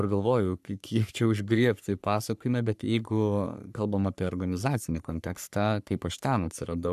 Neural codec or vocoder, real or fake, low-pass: codec, 44.1 kHz, 3.4 kbps, Pupu-Codec; fake; 14.4 kHz